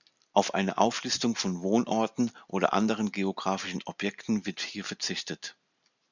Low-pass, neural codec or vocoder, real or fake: 7.2 kHz; none; real